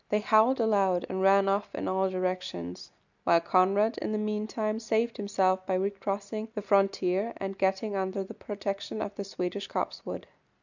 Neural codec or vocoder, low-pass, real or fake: none; 7.2 kHz; real